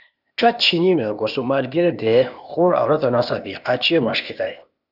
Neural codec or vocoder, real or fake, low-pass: codec, 16 kHz, 0.8 kbps, ZipCodec; fake; 5.4 kHz